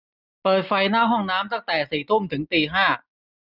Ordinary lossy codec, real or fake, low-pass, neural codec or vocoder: none; real; 5.4 kHz; none